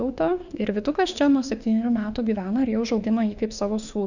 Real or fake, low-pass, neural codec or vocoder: fake; 7.2 kHz; autoencoder, 48 kHz, 32 numbers a frame, DAC-VAE, trained on Japanese speech